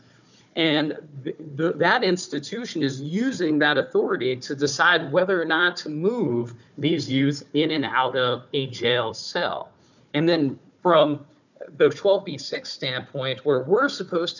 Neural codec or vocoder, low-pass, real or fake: codec, 16 kHz, 4 kbps, FunCodec, trained on Chinese and English, 50 frames a second; 7.2 kHz; fake